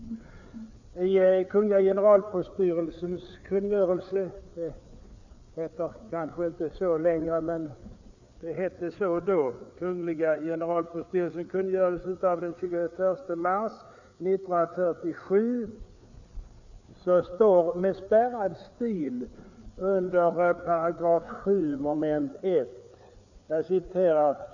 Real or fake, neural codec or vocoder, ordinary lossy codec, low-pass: fake; codec, 16 kHz, 4 kbps, FreqCodec, larger model; none; 7.2 kHz